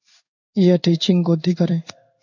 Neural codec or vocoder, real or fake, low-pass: codec, 16 kHz in and 24 kHz out, 1 kbps, XY-Tokenizer; fake; 7.2 kHz